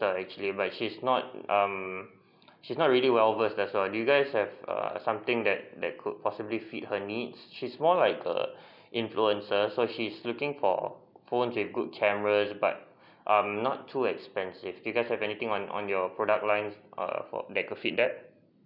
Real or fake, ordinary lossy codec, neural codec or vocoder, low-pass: real; none; none; 5.4 kHz